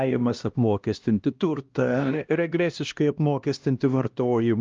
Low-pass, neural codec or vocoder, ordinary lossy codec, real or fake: 7.2 kHz; codec, 16 kHz, 1 kbps, X-Codec, HuBERT features, trained on LibriSpeech; Opus, 24 kbps; fake